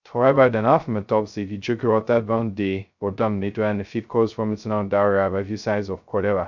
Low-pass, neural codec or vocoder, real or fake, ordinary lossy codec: 7.2 kHz; codec, 16 kHz, 0.2 kbps, FocalCodec; fake; none